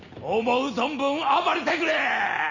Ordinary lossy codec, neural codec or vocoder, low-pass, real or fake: none; none; 7.2 kHz; real